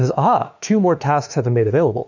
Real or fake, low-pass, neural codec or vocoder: fake; 7.2 kHz; autoencoder, 48 kHz, 32 numbers a frame, DAC-VAE, trained on Japanese speech